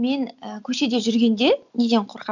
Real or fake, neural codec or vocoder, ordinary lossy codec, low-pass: real; none; none; 7.2 kHz